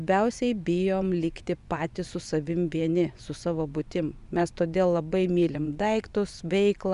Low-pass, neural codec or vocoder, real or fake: 10.8 kHz; vocoder, 24 kHz, 100 mel bands, Vocos; fake